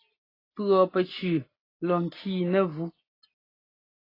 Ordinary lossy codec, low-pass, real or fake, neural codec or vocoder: AAC, 24 kbps; 5.4 kHz; real; none